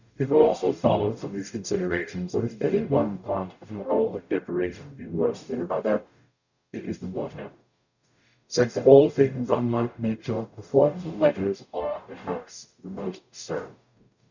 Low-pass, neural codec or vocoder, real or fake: 7.2 kHz; codec, 44.1 kHz, 0.9 kbps, DAC; fake